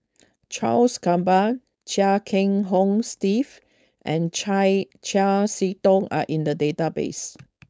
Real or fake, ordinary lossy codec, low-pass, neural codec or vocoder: fake; none; none; codec, 16 kHz, 4.8 kbps, FACodec